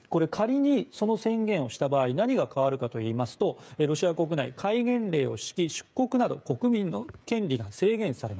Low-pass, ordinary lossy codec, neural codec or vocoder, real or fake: none; none; codec, 16 kHz, 8 kbps, FreqCodec, smaller model; fake